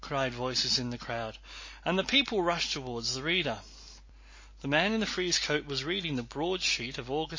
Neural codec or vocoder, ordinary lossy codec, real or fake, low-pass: none; MP3, 32 kbps; real; 7.2 kHz